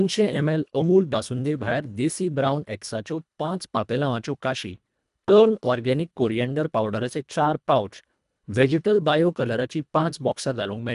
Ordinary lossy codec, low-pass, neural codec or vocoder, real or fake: none; 10.8 kHz; codec, 24 kHz, 1.5 kbps, HILCodec; fake